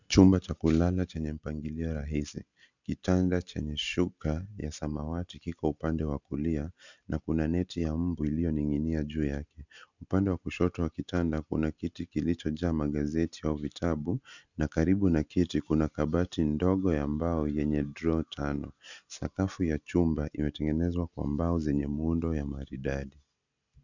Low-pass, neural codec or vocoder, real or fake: 7.2 kHz; none; real